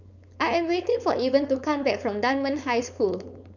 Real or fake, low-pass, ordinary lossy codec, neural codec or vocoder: fake; 7.2 kHz; none; codec, 16 kHz, 4.8 kbps, FACodec